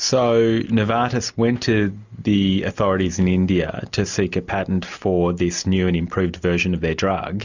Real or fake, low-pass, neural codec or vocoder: real; 7.2 kHz; none